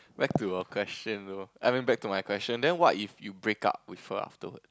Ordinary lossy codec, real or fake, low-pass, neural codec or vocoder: none; real; none; none